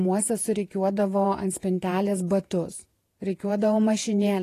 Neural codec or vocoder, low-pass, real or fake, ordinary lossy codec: vocoder, 48 kHz, 128 mel bands, Vocos; 14.4 kHz; fake; AAC, 48 kbps